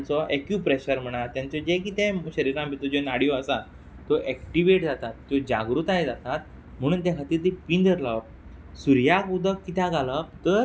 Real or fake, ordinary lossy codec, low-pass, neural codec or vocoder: real; none; none; none